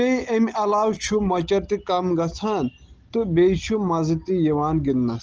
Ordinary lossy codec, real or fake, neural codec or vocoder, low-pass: Opus, 16 kbps; real; none; 7.2 kHz